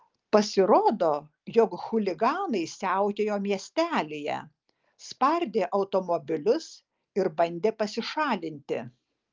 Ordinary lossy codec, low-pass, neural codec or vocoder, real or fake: Opus, 32 kbps; 7.2 kHz; none; real